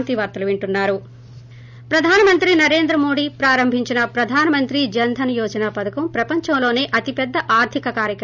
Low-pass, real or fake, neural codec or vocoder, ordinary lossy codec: 7.2 kHz; real; none; none